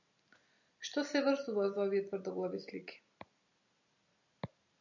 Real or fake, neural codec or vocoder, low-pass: real; none; 7.2 kHz